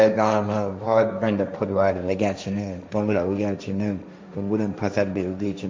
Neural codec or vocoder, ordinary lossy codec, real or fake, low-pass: codec, 16 kHz, 1.1 kbps, Voila-Tokenizer; none; fake; none